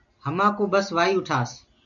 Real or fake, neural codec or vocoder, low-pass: real; none; 7.2 kHz